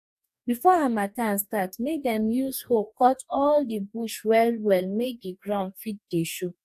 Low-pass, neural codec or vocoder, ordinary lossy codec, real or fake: 14.4 kHz; codec, 44.1 kHz, 2.6 kbps, DAC; AAC, 96 kbps; fake